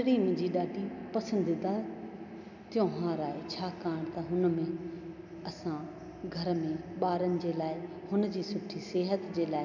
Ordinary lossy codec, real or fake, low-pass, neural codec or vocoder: none; real; 7.2 kHz; none